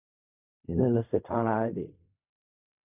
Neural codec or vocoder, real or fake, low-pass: codec, 16 kHz in and 24 kHz out, 0.4 kbps, LongCat-Audio-Codec, fine tuned four codebook decoder; fake; 3.6 kHz